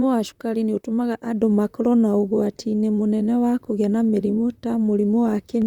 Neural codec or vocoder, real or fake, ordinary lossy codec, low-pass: vocoder, 44.1 kHz, 128 mel bands, Pupu-Vocoder; fake; Opus, 64 kbps; 19.8 kHz